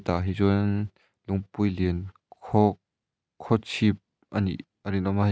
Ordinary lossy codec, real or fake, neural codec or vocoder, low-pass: none; real; none; none